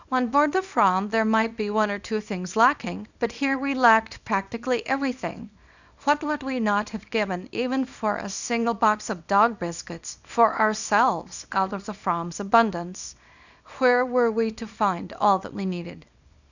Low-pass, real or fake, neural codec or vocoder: 7.2 kHz; fake; codec, 24 kHz, 0.9 kbps, WavTokenizer, small release